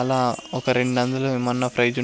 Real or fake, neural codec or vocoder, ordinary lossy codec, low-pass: real; none; none; none